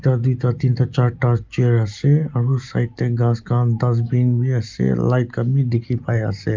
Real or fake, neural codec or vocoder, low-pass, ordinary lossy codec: real; none; 7.2 kHz; Opus, 24 kbps